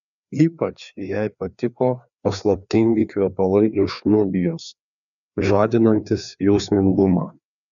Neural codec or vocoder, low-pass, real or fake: codec, 16 kHz, 2 kbps, FreqCodec, larger model; 7.2 kHz; fake